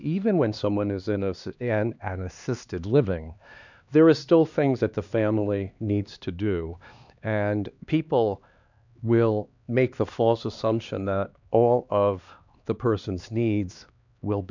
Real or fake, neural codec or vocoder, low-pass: fake; codec, 16 kHz, 2 kbps, X-Codec, HuBERT features, trained on LibriSpeech; 7.2 kHz